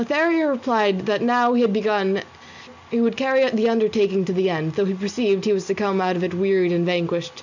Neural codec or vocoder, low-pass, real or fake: none; 7.2 kHz; real